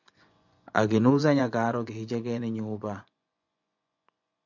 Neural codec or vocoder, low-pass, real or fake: vocoder, 24 kHz, 100 mel bands, Vocos; 7.2 kHz; fake